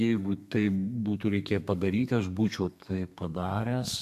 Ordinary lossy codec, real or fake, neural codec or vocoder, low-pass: MP3, 96 kbps; fake; codec, 32 kHz, 1.9 kbps, SNAC; 14.4 kHz